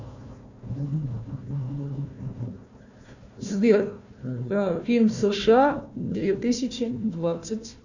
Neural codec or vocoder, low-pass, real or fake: codec, 16 kHz, 1 kbps, FunCodec, trained on Chinese and English, 50 frames a second; 7.2 kHz; fake